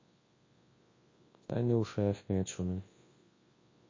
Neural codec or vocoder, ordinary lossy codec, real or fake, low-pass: codec, 24 kHz, 0.9 kbps, WavTokenizer, large speech release; MP3, 32 kbps; fake; 7.2 kHz